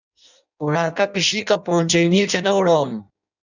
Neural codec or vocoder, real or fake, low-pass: codec, 16 kHz in and 24 kHz out, 0.6 kbps, FireRedTTS-2 codec; fake; 7.2 kHz